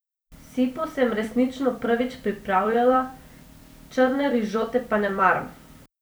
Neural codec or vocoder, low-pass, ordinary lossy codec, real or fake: vocoder, 44.1 kHz, 128 mel bands every 256 samples, BigVGAN v2; none; none; fake